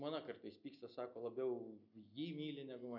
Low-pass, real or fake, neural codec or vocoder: 5.4 kHz; real; none